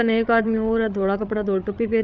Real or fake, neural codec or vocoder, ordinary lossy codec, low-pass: fake; codec, 16 kHz, 8 kbps, FreqCodec, larger model; none; none